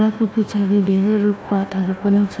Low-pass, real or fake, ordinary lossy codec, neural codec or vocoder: none; fake; none; codec, 16 kHz, 1 kbps, FunCodec, trained on Chinese and English, 50 frames a second